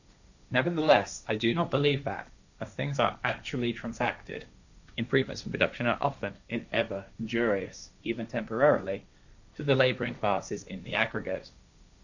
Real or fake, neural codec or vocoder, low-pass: fake; codec, 16 kHz, 1.1 kbps, Voila-Tokenizer; 7.2 kHz